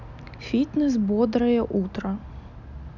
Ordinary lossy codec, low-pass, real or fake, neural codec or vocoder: none; 7.2 kHz; real; none